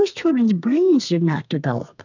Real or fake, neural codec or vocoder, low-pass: fake; codec, 32 kHz, 1.9 kbps, SNAC; 7.2 kHz